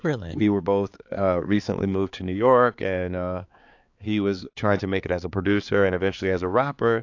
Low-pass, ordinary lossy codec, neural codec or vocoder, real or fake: 7.2 kHz; AAC, 48 kbps; codec, 16 kHz, 4 kbps, X-Codec, HuBERT features, trained on balanced general audio; fake